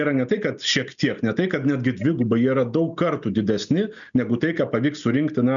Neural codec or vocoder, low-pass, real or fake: none; 7.2 kHz; real